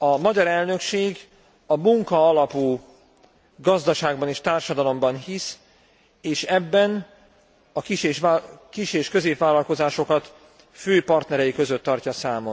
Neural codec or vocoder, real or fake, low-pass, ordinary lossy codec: none; real; none; none